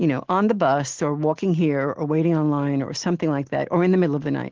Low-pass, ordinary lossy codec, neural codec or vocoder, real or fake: 7.2 kHz; Opus, 16 kbps; none; real